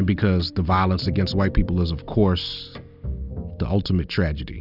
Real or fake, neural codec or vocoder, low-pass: real; none; 5.4 kHz